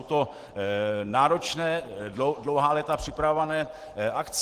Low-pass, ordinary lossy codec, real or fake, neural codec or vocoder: 14.4 kHz; Opus, 16 kbps; real; none